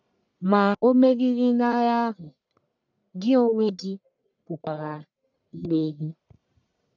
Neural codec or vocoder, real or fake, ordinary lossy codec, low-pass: codec, 44.1 kHz, 1.7 kbps, Pupu-Codec; fake; none; 7.2 kHz